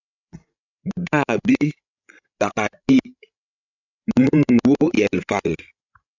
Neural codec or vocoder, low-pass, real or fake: codec, 16 kHz, 16 kbps, FreqCodec, larger model; 7.2 kHz; fake